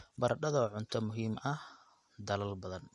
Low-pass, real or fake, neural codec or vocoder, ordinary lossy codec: 14.4 kHz; real; none; MP3, 48 kbps